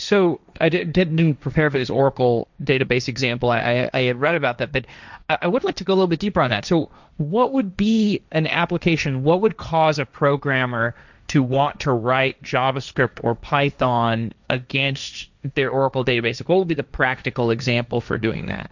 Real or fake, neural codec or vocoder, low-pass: fake; codec, 16 kHz, 1.1 kbps, Voila-Tokenizer; 7.2 kHz